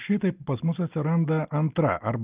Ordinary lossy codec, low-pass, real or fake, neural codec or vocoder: Opus, 24 kbps; 3.6 kHz; real; none